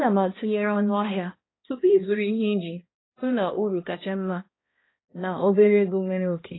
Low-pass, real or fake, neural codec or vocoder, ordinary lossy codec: 7.2 kHz; fake; codec, 16 kHz, 1 kbps, X-Codec, HuBERT features, trained on balanced general audio; AAC, 16 kbps